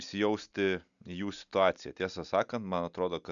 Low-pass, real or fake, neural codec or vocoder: 7.2 kHz; real; none